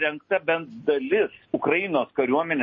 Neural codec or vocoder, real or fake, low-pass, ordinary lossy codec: none; real; 7.2 kHz; MP3, 32 kbps